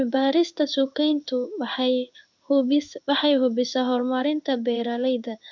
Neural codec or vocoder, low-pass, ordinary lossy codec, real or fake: codec, 16 kHz in and 24 kHz out, 1 kbps, XY-Tokenizer; 7.2 kHz; MP3, 64 kbps; fake